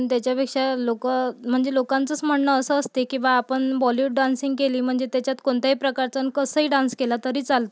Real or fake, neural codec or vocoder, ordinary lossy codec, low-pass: real; none; none; none